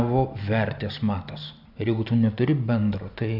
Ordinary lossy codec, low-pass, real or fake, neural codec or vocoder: AAC, 48 kbps; 5.4 kHz; real; none